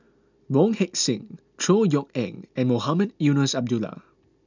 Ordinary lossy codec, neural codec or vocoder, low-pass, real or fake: none; none; 7.2 kHz; real